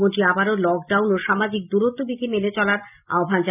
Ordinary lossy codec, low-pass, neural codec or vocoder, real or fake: none; 3.6 kHz; none; real